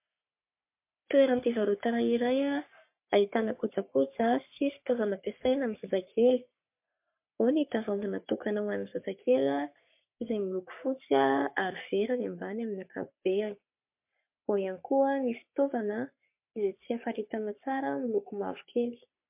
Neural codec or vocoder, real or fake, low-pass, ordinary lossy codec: codec, 44.1 kHz, 3.4 kbps, Pupu-Codec; fake; 3.6 kHz; MP3, 32 kbps